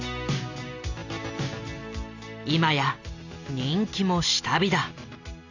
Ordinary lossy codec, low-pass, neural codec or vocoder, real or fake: none; 7.2 kHz; none; real